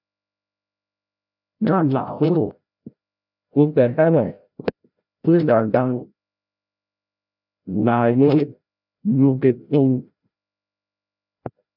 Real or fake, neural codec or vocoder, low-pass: fake; codec, 16 kHz, 0.5 kbps, FreqCodec, larger model; 5.4 kHz